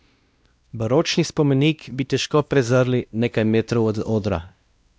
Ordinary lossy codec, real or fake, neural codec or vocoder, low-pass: none; fake; codec, 16 kHz, 1 kbps, X-Codec, WavLM features, trained on Multilingual LibriSpeech; none